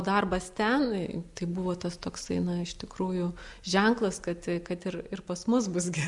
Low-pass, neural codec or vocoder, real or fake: 10.8 kHz; none; real